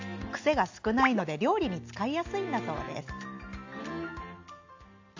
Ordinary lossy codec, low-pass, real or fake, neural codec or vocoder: AAC, 48 kbps; 7.2 kHz; real; none